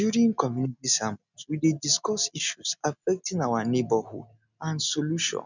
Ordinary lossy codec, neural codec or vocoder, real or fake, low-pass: none; none; real; 7.2 kHz